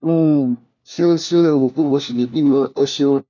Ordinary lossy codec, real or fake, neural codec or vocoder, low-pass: none; fake; codec, 16 kHz, 1 kbps, FunCodec, trained on LibriTTS, 50 frames a second; 7.2 kHz